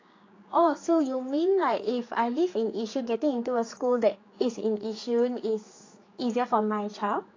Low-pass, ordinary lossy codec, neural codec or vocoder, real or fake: 7.2 kHz; AAC, 32 kbps; codec, 16 kHz, 4 kbps, X-Codec, HuBERT features, trained on general audio; fake